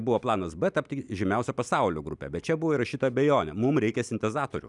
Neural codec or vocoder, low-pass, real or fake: none; 10.8 kHz; real